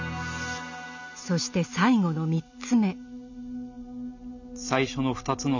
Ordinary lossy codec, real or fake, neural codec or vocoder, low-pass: none; real; none; 7.2 kHz